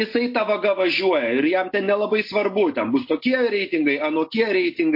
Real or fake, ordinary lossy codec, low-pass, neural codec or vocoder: real; MP3, 32 kbps; 5.4 kHz; none